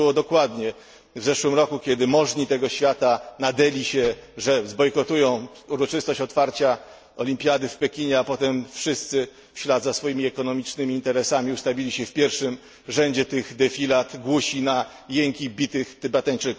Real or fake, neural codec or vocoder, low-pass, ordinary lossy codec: real; none; none; none